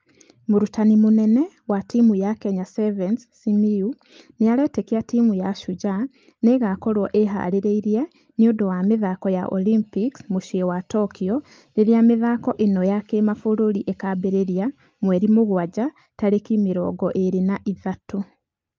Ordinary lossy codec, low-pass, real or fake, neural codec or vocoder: Opus, 24 kbps; 7.2 kHz; real; none